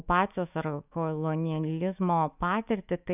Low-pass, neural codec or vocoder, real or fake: 3.6 kHz; autoencoder, 48 kHz, 128 numbers a frame, DAC-VAE, trained on Japanese speech; fake